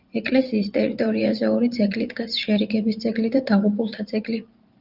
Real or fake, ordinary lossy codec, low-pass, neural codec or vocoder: fake; Opus, 24 kbps; 5.4 kHz; vocoder, 44.1 kHz, 128 mel bands every 512 samples, BigVGAN v2